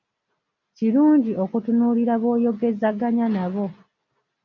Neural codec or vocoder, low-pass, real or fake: none; 7.2 kHz; real